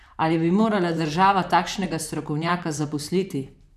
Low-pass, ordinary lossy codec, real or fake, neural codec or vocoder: 14.4 kHz; none; fake; vocoder, 44.1 kHz, 128 mel bands, Pupu-Vocoder